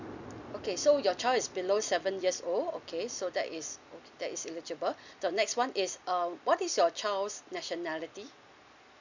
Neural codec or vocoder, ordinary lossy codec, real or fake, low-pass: none; none; real; 7.2 kHz